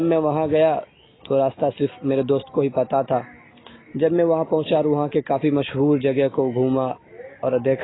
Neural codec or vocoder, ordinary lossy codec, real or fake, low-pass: none; AAC, 16 kbps; real; 7.2 kHz